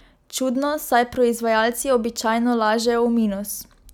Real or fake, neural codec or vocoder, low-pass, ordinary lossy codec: real; none; 19.8 kHz; none